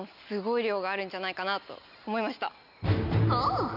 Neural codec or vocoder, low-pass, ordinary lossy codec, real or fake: none; 5.4 kHz; none; real